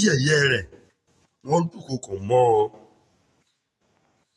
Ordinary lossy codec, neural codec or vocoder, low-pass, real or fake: AAC, 32 kbps; autoencoder, 48 kHz, 128 numbers a frame, DAC-VAE, trained on Japanese speech; 19.8 kHz; fake